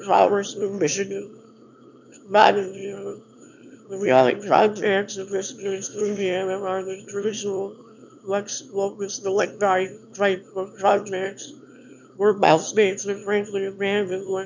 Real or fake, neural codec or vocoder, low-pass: fake; autoencoder, 22.05 kHz, a latent of 192 numbers a frame, VITS, trained on one speaker; 7.2 kHz